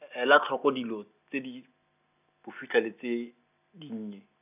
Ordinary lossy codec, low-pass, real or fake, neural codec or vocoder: none; 3.6 kHz; real; none